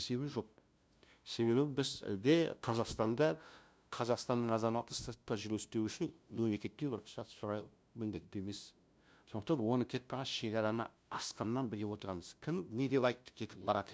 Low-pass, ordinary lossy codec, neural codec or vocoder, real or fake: none; none; codec, 16 kHz, 0.5 kbps, FunCodec, trained on LibriTTS, 25 frames a second; fake